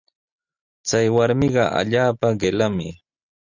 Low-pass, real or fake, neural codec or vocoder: 7.2 kHz; real; none